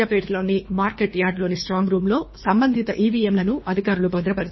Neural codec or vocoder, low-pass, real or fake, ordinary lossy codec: codec, 24 kHz, 3 kbps, HILCodec; 7.2 kHz; fake; MP3, 24 kbps